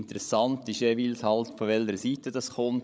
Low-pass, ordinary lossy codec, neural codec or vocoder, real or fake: none; none; codec, 16 kHz, 16 kbps, FreqCodec, larger model; fake